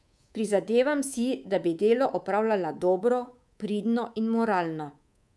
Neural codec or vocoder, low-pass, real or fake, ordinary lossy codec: codec, 24 kHz, 3.1 kbps, DualCodec; none; fake; none